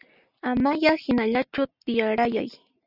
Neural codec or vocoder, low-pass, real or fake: none; 5.4 kHz; real